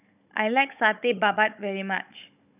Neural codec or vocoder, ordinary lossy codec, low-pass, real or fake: codec, 16 kHz, 16 kbps, FunCodec, trained on Chinese and English, 50 frames a second; none; 3.6 kHz; fake